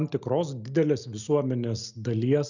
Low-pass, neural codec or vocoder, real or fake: 7.2 kHz; none; real